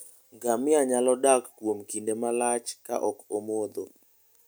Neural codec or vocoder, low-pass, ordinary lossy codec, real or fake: none; none; none; real